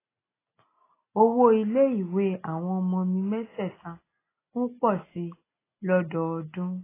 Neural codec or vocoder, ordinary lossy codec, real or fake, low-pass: none; AAC, 16 kbps; real; 3.6 kHz